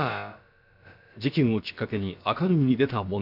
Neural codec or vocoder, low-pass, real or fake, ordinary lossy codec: codec, 16 kHz, about 1 kbps, DyCAST, with the encoder's durations; 5.4 kHz; fake; none